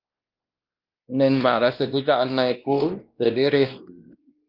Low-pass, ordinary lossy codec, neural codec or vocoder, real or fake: 5.4 kHz; Opus, 24 kbps; codec, 16 kHz, 1 kbps, X-Codec, WavLM features, trained on Multilingual LibriSpeech; fake